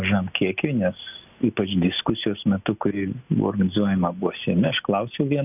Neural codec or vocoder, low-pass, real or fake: none; 3.6 kHz; real